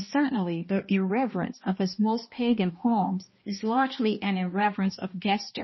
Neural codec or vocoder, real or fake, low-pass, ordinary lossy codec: codec, 16 kHz, 1 kbps, X-Codec, HuBERT features, trained on balanced general audio; fake; 7.2 kHz; MP3, 24 kbps